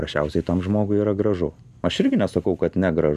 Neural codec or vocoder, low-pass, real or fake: none; 14.4 kHz; real